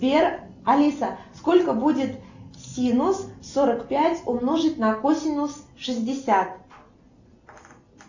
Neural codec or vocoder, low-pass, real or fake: none; 7.2 kHz; real